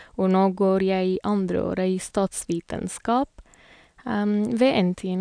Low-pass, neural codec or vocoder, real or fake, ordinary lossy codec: 9.9 kHz; none; real; none